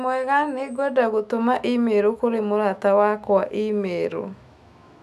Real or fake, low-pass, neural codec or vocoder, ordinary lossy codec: fake; 14.4 kHz; autoencoder, 48 kHz, 128 numbers a frame, DAC-VAE, trained on Japanese speech; none